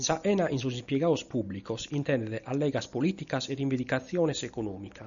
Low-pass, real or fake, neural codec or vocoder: 7.2 kHz; real; none